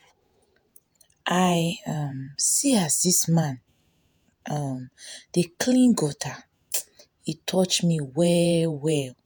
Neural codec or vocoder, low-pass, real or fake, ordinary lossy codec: vocoder, 48 kHz, 128 mel bands, Vocos; none; fake; none